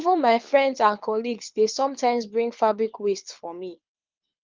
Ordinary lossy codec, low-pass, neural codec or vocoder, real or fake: Opus, 24 kbps; 7.2 kHz; codec, 24 kHz, 6 kbps, HILCodec; fake